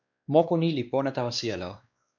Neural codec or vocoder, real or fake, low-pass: codec, 16 kHz, 2 kbps, X-Codec, WavLM features, trained on Multilingual LibriSpeech; fake; 7.2 kHz